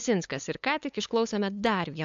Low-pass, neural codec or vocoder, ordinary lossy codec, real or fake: 7.2 kHz; codec, 16 kHz, 8 kbps, FunCodec, trained on LibriTTS, 25 frames a second; AAC, 64 kbps; fake